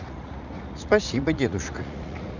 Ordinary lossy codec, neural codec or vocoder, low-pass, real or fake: none; vocoder, 44.1 kHz, 80 mel bands, Vocos; 7.2 kHz; fake